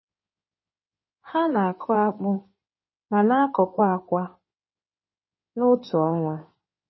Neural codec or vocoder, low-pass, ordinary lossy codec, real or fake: codec, 16 kHz in and 24 kHz out, 2.2 kbps, FireRedTTS-2 codec; 7.2 kHz; MP3, 24 kbps; fake